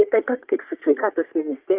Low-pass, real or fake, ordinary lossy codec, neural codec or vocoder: 3.6 kHz; fake; Opus, 32 kbps; codec, 44.1 kHz, 3.4 kbps, Pupu-Codec